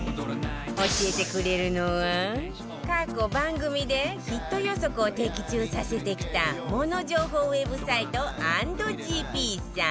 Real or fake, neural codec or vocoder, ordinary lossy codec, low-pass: real; none; none; none